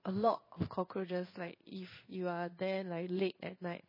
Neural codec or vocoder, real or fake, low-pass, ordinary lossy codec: codec, 16 kHz in and 24 kHz out, 1 kbps, XY-Tokenizer; fake; 5.4 kHz; MP3, 24 kbps